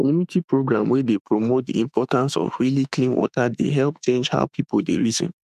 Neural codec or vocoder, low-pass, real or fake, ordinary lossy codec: autoencoder, 48 kHz, 32 numbers a frame, DAC-VAE, trained on Japanese speech; 14.4 kHz; fake; none